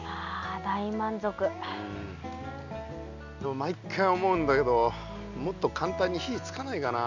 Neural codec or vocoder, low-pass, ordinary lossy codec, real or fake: none; 7.2 kHz; none; real